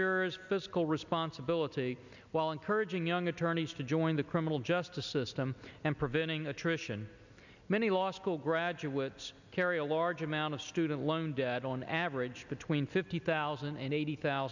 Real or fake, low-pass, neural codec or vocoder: real; 7.2 kHz; none